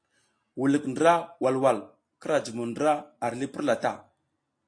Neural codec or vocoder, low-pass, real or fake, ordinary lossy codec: none; 9.9 kHz; real; AAC, 48 kbps